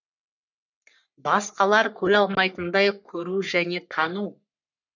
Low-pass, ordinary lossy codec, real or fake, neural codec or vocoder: 7.2 kHz; none; fake; codec, 44.1 kHz, 3.4 kbps, Pupu-Codec